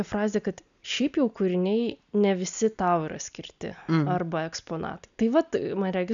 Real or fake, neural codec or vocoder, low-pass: real; none; 7.2 kHz